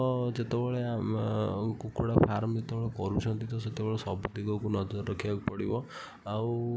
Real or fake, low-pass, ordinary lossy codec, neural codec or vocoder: real; none; none; none